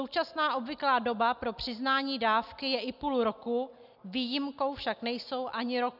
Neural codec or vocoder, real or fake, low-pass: none; real; 5.4 kHz